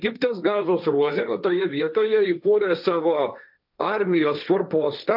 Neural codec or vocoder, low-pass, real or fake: codec, 16 kHz, 1.1 kbps, Voila-Tokenizer; 5.4 kHz; fake